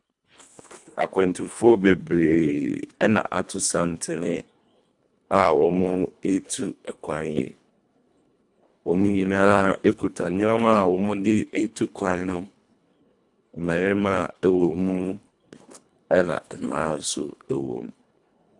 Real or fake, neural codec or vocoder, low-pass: fake; codec, 24 kHz, 1.5 kbps, HILCodec; 10.8 kHz